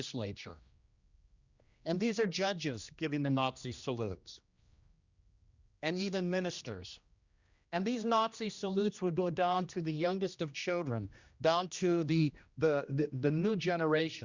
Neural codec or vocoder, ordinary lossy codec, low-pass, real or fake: codec, 16 kHz, 1 kbps, X-Codec, HuBERT features, trained on general audio; Opus, 64 kbps; 7.2 kHz; fake